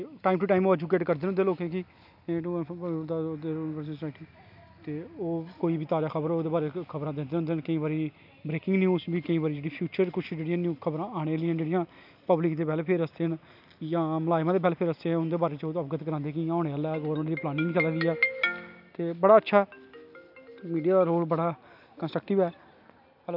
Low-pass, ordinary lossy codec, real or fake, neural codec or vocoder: 5.4 kHz; none; real; none